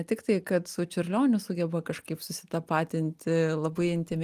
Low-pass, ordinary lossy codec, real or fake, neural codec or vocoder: 14.4 kHz; Opus, 32 kbps; real; none